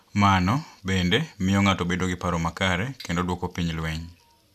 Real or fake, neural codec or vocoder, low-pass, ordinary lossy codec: real; none; 14.4 kHz; none